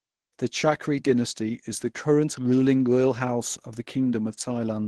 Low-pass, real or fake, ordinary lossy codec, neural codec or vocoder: 10.8 kHz; fake; Opus, 16 kbps; codec, 24 kHz, 0.9 kbps, WavTokenizer, medium speech release version 1